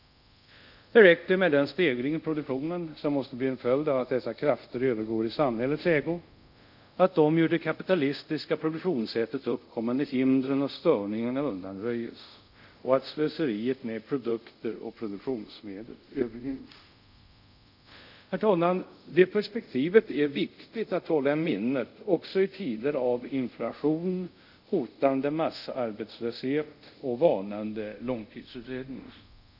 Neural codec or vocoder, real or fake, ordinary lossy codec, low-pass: codec, 24 kHz, 0.5 kbps, DualCodec; fake; none; 5.4 kHz